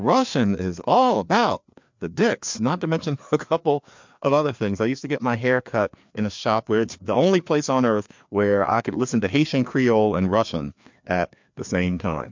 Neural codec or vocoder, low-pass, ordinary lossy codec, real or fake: codec, 16 kHz, 2 kbps, FreqCodec, larger model; 7.2 kHz; MP3, 64 kbps; fake